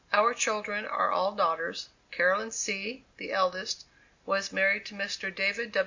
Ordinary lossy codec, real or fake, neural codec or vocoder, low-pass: MP3, 48 kbps; real; none; 7.2 kHz